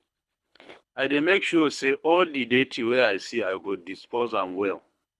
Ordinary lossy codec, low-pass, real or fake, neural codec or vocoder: none; none; fake; codec, 24 kHz, 3 kbps, HILCodec